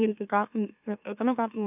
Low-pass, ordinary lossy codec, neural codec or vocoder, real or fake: 3.6 kHz; none; autoencoder, 44.1 kHz, a latent of 192 numbers a frame, MeloTTS; fake